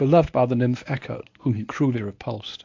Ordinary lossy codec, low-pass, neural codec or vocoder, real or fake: AAC, 48 kbps; 7.2 kHz; codec, 24 kHz, 0.9 kbps, WavTokenizer, medium speech release version 1; fake